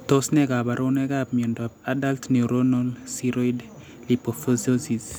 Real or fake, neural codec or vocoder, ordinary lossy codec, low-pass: real; none; none; none